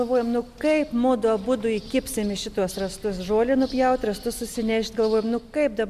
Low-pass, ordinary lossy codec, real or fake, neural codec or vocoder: 14.4 kHz; MP3, 96 kbps; real; none